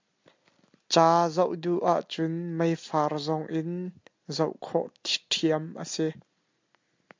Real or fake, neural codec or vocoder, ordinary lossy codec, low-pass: real; none; MP3, 64 kbps; 7.2 kHz